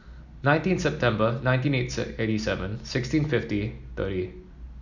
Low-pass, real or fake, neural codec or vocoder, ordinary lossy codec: 7.2 kHz; real; none; none